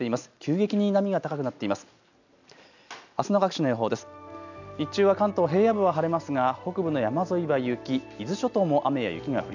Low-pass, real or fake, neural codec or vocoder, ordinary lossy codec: 7.2 kHz; real; none; none